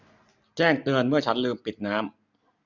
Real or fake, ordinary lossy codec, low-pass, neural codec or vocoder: real; none; 7.2 kHz; none